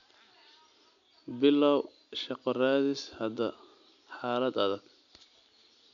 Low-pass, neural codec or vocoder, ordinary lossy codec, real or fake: 7.2 kHz; none; none; real